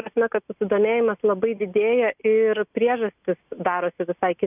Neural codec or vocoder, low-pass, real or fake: none; 3.6 kHz; real